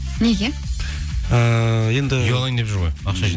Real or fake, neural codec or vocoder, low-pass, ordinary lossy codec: real; none; none; none